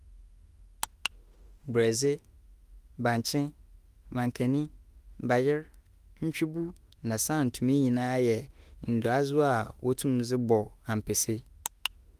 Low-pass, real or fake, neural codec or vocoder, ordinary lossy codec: 14.4 kHz; fake; autoencoder, 48 kHz, 32 numbers a frame, DAC-VAE, trained on Japanese speech; Opus, 32 kbps